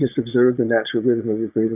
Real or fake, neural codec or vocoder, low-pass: fake; vocoder, 22.05 kHz, 80 mel bands, Vocos; 3.6 kHz